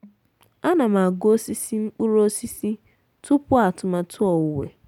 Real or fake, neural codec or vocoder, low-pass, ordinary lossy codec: real; none; 19.8 kHz; none